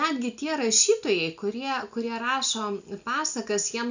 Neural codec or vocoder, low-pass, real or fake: none; 7.2 kHz; real